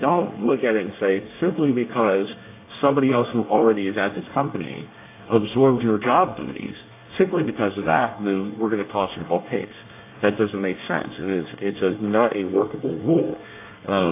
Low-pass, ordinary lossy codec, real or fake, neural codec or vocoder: 3.6 kHz; AAC, 24 kbps; fake; codec, 24 kHz, 1 kbps, SNAC